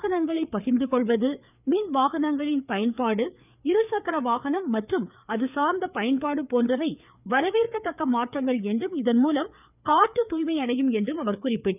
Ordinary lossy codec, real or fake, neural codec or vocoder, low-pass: none; fake; codec, 16 kHz, 4 kbps, FreqCodec, larger model; 3.6 kHz